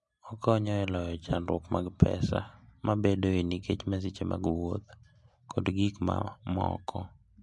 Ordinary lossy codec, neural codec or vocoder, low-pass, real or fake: MP3, 64 kbps; none; 10.8 kHz; real